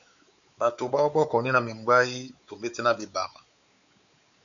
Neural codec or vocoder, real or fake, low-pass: codec, 16 kHz, 4 kbps, X-Codec, WavLM features, trained on Multilingual LibriSpeech; fake; 7.2 kHz